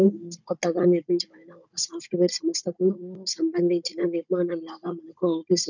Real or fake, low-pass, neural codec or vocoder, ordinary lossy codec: fake; 7.2 kHz; codec, 16 kHz, 16 kbps, FunCodec, trained on Chinese and English, 50 frames a second; none